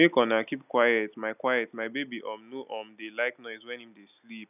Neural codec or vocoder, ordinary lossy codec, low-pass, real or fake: none; none; 3.6 kHz; real